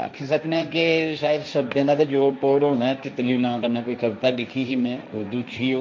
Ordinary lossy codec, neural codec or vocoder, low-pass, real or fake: none; codec, 16 kHz, 1.1 kbps, Voila-Tokenizer; none; fake